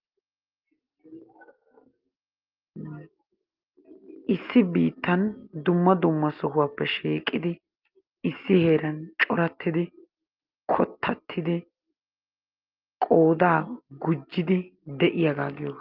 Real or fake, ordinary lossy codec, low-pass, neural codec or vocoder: real; Opus, 32 kbps; 5.4 kHz; none